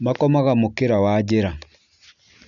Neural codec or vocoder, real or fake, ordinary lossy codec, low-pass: none; real; none; 7.2 kHz